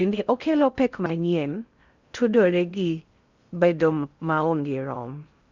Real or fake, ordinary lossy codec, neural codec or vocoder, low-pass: fake; Opus, 64 kbps; codec, 16 kHz in and 24 kHz out, 0.6 kbps, FocalCodec, streaming, 4096 codes; 7.2 kHz